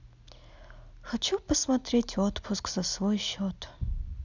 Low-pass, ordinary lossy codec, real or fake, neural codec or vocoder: 7.2 kHz; none; real; none